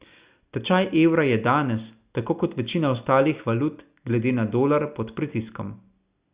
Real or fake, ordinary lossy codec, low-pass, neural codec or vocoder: real; Opus, 64 kbps; 3.6 kHz; none